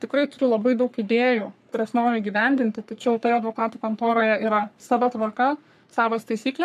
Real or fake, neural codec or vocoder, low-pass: fake; codec, 44.1 kHz, 3.4 kbps, Pupu-Codec; 14.4 kHz